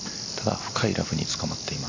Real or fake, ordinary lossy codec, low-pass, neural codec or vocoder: real; AAC, 48 kbps; 7.2 kHz; none